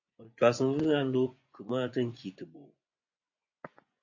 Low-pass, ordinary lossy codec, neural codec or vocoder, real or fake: 7.2 kHz; AAC, 48 kbps; vocoder, 24 kHz, 100 mel bands, Vocos; fake